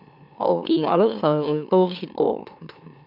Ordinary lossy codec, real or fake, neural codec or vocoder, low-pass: none; fake; autoencoder, 44.1 kHz, a latent of 192 numbers a frame, MeloTTS; 5.4 kHz